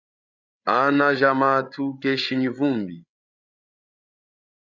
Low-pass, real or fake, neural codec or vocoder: 7.2 kHz; fake; codec, 16 kHz, 8 kbps, FreqCodec, larger model